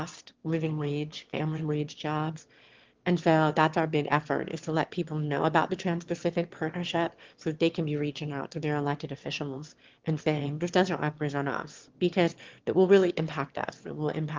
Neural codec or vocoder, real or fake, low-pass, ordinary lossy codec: autoencoder, 22.05 kHz, a latent of 192 numbers a frame, VITS, trained on one speaker; fake; 7.2 kHz; Opus, 16 kbps